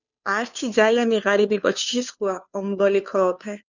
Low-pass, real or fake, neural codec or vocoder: 7.2 kHz; fake; codec, 16 kHz, 2 kbps, FunCodec, trained on Chinese and English, 25 frames a second